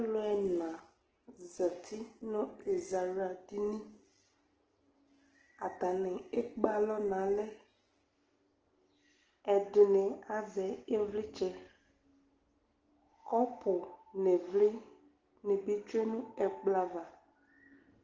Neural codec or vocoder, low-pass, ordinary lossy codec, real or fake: none; 7.2 kHz; Opus, 16 kbps; real